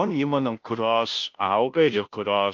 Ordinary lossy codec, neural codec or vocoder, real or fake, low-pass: Opus, 24 kbps; codec, 16 kHz, 0.5 kbps, FunCodec, trained on Chinese and English, 25 frames a second; fake; 7.2 kHz